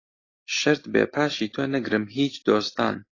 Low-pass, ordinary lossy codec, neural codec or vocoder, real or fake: 7.2 kHz; AAC, 32 kbps; none; real